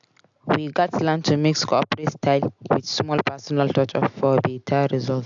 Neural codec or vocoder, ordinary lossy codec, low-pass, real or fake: none; AAC, 64 kbps; 7.2 kHz; real